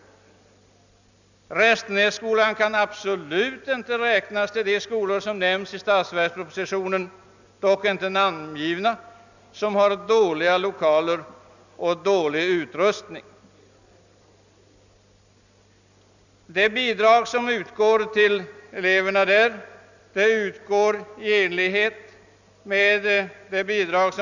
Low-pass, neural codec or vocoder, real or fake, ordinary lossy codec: 7.2 kHz; none; real; none